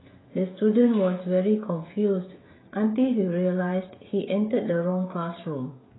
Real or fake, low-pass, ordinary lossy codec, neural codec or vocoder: fake; 7.2 kHz; AAC, 16 kbps; codec, 16 kHz, 16 kbps, FreqCodec, smaller model